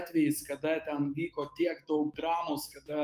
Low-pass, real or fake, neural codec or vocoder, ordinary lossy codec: 14.4 kHz; fake; autoencoder, 48 kHz, 128 numbers a frame, DAC-VAE, trained on Japanese speech; Opus, 32 kbps